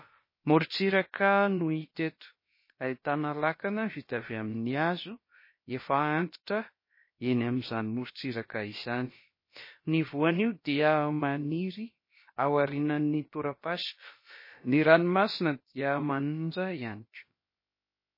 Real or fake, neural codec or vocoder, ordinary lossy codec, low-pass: fake; codec, 16 kHz, about 1 kbps, DyCAST, with the encoder's durations; MP3, 24 kbps; 5.4 kHz